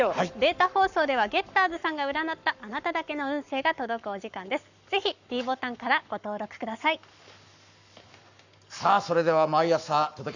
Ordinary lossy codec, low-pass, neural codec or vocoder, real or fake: none; 7.2 kHz; codec, 44.1 kHz, 7.8 kbps, Pupu-Codec; fake